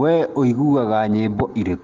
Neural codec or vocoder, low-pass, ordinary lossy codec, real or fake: none; 7.2 kHz; Opus, 16 kbps; real